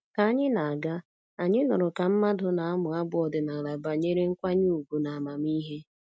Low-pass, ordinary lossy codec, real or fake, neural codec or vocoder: none; none; real; none